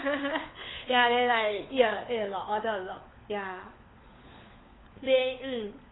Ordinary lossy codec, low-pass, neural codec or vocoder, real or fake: AAC, 16 kbps; 7.2 kHz; codec, 16 kHz, 4 kbps, FunCodec, trained on LibriTTS, 50 frames a second; fake